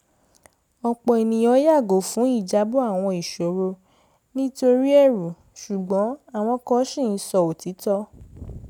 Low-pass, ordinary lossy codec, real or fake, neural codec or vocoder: 19.8 kHz; none; real; none